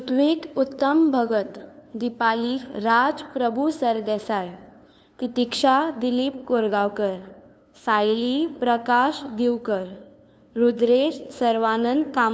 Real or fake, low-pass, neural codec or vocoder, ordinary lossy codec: fake; none; codec, 16 kHz, 2 kbps, FunCodec, trained on LibriTTS, 25 frames a second; none